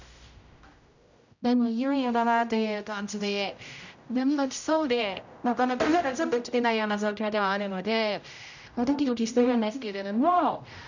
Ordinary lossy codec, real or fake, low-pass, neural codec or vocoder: none; fake; 7.2 kHz; codec, 16 kHz, 0.5 kbps, X-Codec, HuBERT features, trained on general audio